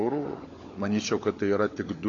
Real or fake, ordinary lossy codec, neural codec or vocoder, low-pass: fake; AAC, 32 kbps; codec, 16 kHz, 16 kbps, FunCodec, trained on LibriTTS, 50 frames a second; 7.2 kHz